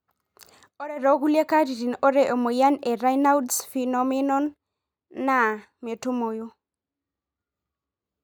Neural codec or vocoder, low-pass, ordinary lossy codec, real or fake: none; none; none; real